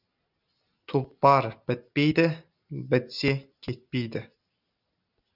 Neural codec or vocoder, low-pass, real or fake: none; 5.4 kHz; real